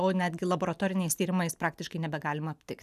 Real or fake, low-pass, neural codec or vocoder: real; 14.4 kHz; none